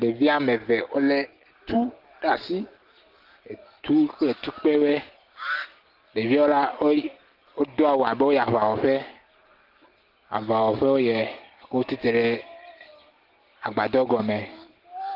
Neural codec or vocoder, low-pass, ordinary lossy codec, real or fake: none; 5.4 kHz; Opus, 16 kbps; real